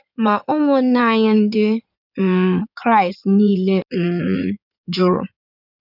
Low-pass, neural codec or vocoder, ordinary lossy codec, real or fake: 5.4 kHz; codec, 16 kHz in and 24 kHz out, 2.2 kbps, FireRedTTS-2 codec; none; fake